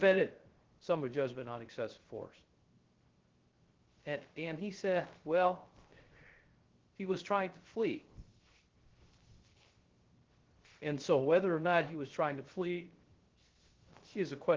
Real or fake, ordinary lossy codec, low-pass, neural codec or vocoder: fake; Opus, 16 kbps; 7.2 kHz; codec, 16 kHz, 0.3 kbps, FocalCodec